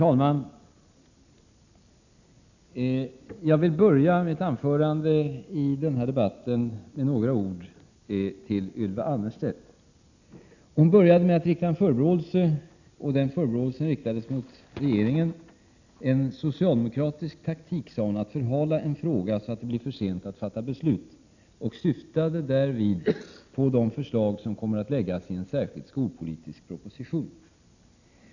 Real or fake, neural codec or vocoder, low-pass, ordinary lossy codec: real; none; 7.2 kHz; none